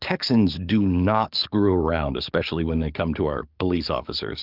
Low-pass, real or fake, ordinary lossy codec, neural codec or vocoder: 5.4 kHz; fake; Opus, 24 kbps; codec, 44.1 kHz, 7.8 kbps, DAC